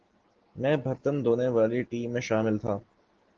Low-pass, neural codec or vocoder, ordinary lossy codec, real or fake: 7.2 kHz; none; Opus, 16 kbps; real